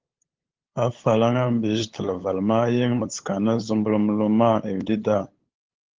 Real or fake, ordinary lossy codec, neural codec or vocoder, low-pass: fake; Opus, 16 kbps; codec, 16 kHz, 8 kbps, FunCodec, trained on LibriTTS, 25 frames a second; 7.2 kHz